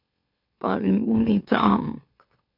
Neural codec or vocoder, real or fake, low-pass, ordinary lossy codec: autoencoder, 44.1 kHz, a latent of 192 numbers a frame, MeloTTS; fake; 5.4 kHz; MP3, 48 kbps